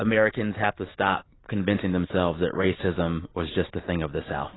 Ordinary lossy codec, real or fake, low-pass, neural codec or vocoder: AAC, 16 kbps; real; 7.2 kHz; none